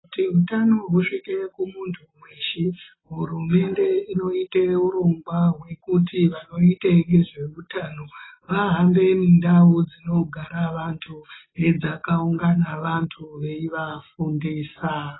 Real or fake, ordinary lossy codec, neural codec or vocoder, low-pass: real; AAC, 16 kbps; none; 7.2 kHz